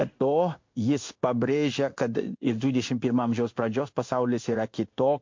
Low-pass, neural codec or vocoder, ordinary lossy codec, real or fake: 7.2 kHz; codec, 16 kHz in and 24 kHz out, 1 kbps, XY-Tokenizer; MP3, 48 kbps; fake